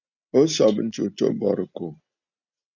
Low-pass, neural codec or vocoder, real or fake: 7.2 kHz; none; real